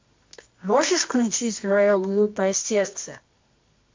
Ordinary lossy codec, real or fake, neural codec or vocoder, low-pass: MP3, 48 kbps; fake; codec, 24 kHz, 0.9 kbps, WavTokenizer, medium music audio release; 7.2 kHz